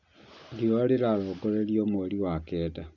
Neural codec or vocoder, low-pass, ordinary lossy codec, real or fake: none; 7.2 kHz; none; real